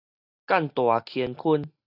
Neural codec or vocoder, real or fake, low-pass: none; real; 5.4 kHz